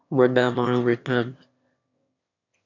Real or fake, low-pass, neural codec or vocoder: fake; 7.2 kHz; autoencoder, 22.05 kHz, a latent of 192 numbers a frame, VITS, trained on one speaker